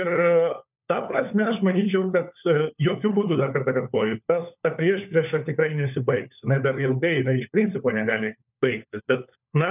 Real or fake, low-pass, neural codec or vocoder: fake; 3.6 kHz; codec, 16 kHz, 4 kbps, FunCodec, trained on LibriTTS, 50 frames a second